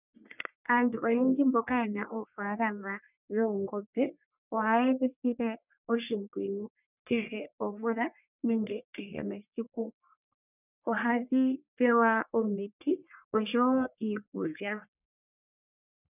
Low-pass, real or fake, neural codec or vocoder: 3.6 kHz; fake; codec, 44.1 kHz, 1.7 kbps, Pupu-Codec